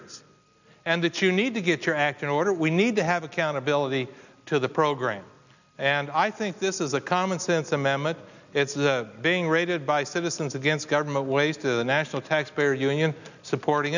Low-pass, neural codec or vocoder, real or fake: 7.2 kHz; none; real